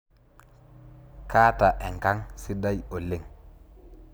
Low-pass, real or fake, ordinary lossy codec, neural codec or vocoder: none; real; none; none